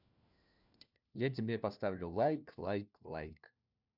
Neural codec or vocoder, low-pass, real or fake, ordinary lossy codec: codec, 16 kHz, 1 kbps, FunCodec, trained on LibriTTS, 50 frames a second; 5.4 kHz; fake; none